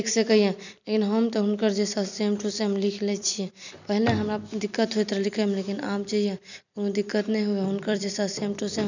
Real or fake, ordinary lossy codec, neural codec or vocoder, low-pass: fake; none; vocoder, 44.1 kHz, 128 mel bands every 256 samples, BigVGAN v2; 7.2 kHz